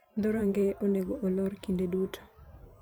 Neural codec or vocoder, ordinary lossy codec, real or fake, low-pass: vocoder, 44.1 kHz, 128 mel bands every 512 samples, BigVGAN v2; none; fake; none